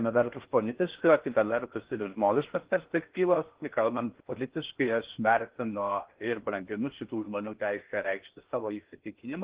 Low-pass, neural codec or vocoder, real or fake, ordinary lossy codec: 3.6 kHz; codec, 16 kHz in and 24 kHz out, 0.6 kbps, FocalCodec, streaming, 4096 codes; fake; Opus, 16 kbps